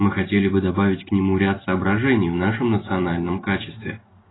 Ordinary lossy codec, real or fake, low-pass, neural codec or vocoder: AAC, 16 kbps; real; 7.2 kHz; none